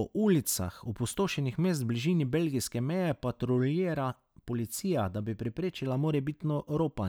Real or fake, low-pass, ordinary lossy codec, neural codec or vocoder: real; none; none; none